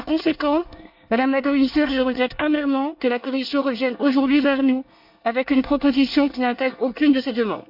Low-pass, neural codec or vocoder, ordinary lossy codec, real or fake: 5.4 kHz; codec, 24 kHz, 1 kbps, SNAC; none; fake